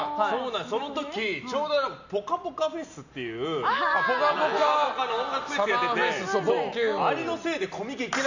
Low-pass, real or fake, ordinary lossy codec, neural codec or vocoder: 7.2 kHz; real; none; none